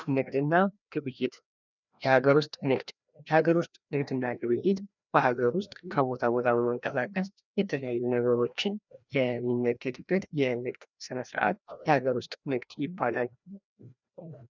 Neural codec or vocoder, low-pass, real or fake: codec, 16 kHz, 1 kbps, FreqCodec, larger model; 7.2 kHz; fake